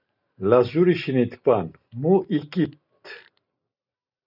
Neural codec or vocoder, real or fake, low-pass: none; real; 5.4 kHz